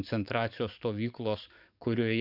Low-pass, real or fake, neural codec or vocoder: 5.4 kHz; fake; codec, 44.1 kHz, 7.8 kbps, DAC